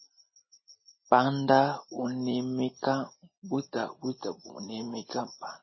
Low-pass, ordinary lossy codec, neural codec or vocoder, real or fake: 7.2 kHz; MP3, 24 kbps; none; real